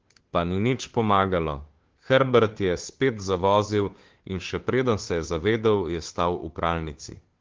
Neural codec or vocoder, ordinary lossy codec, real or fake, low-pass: codec, 16 kHz, 2 kbps, FunCodec, trained on Chinese and English, 25 frames a second; Opus, 16 kbps; fake; 7.2 kHz